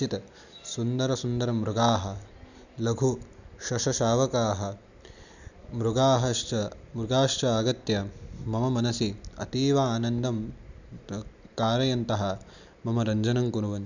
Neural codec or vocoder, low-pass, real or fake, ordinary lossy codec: none; 7.2 kHz; real; none